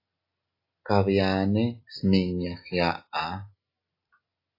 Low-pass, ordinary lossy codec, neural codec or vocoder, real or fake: 5.4 kHz; AAC, 32 kbps; none; real